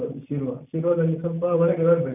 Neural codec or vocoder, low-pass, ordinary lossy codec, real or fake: none; 3.6 kHz; none; real